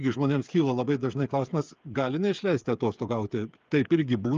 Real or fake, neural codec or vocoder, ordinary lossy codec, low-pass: fake; codec, 16 kHz, 8 kbps, FreqCodec, smaller model; Opus, 32 kbps; 7.2 kHz